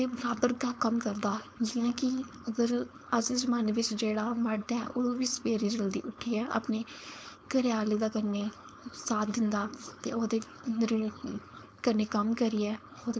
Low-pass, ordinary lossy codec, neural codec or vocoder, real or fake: none; none; codec, 16 kHz, 4.8 kbps, FACodec; fake